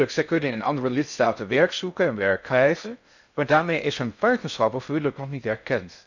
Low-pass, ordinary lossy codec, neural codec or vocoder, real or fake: 7.2 kHz; none; codec, 16 kHz in and 24 kHz out, 0.6 kbps, FocalCodec, streaming, 4096 codes; fake